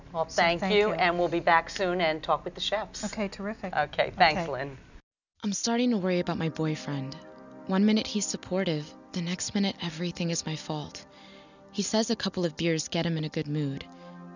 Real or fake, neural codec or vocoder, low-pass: real; none; 7.2 kHz